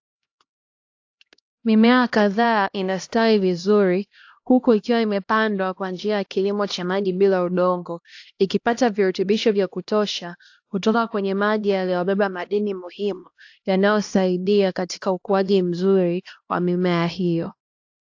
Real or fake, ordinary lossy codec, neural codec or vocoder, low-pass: fake; AAC, 48 kbps; codec, 16 kHz, 1 kbps, X-Codec, HuBERT features, trained on LibriSpeech; 7.2 kHz